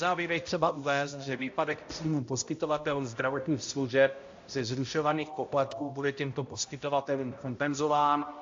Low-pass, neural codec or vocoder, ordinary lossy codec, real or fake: 7.2 kHz; codec, 16 kHz, 0.5 kbps, X-Codec, HuBERT features, trained on balanced general audio; AAC, 48 kbps; fake